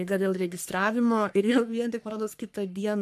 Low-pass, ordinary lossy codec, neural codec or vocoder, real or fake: 14.4 kHz; MP3, 96 kbps; codec, 44.1 kHz, 3.4 kbps, Pupu-Codec; fake